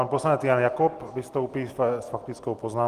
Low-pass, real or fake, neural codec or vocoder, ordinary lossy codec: 14.4 kHz; real; none; Opus, 24 kbps